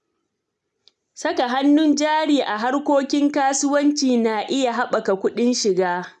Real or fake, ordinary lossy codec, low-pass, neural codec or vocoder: real; none; none; none